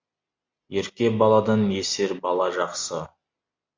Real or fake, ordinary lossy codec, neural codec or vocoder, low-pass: real; MP3, 64 kbps; none; 7.2 kHz